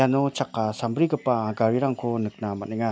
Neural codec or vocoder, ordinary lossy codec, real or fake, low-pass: none; none; real; none